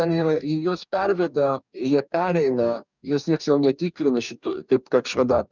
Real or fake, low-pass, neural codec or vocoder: fake; 7.2 kHz; codec, 44.1 kHz, 2.6 kbps, DAC